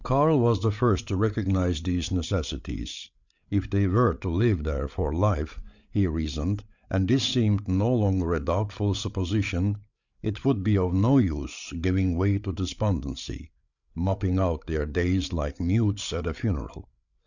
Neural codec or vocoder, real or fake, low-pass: codec, 16 kHz, 8 kbps, FreqCodec, larger model; fake; 7.2 kHz